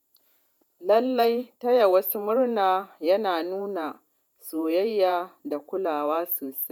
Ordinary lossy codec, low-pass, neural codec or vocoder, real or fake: none; 19.8 kHz; vocoder, 48 kHz, 128 mel bands, Vocos; fake